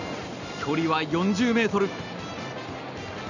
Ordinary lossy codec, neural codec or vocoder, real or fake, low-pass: none; none; real; 7.2 kHz